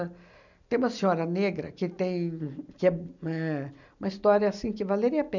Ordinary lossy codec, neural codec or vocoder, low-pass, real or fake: none; none; 7.2 kHz; real